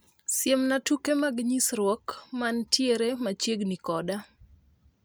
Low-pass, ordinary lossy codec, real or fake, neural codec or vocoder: none; none; real; none